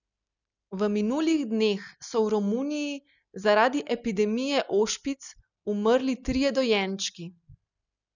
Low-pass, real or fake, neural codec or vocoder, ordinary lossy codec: 7.2 kHz; real; none; none